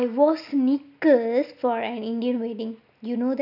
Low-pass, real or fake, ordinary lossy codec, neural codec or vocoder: 5.4 kHz; real; none; none